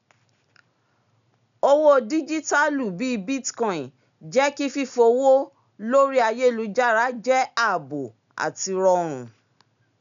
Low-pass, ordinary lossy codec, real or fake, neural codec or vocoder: 7.2 kHz; none; real; none